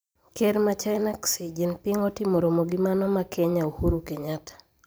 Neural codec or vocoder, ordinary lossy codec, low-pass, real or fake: vocoder, 44.1 kHz, 128 mel bands every 512 samples, BigVGAN v2; none; none; fake